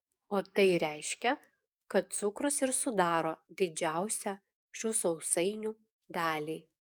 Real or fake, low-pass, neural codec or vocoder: fake; 19.8 kHz; codec, 44.1 kHz, 7.8 kbps, DAC